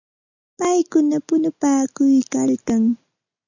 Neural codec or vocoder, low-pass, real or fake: none; 7.2 kHz; real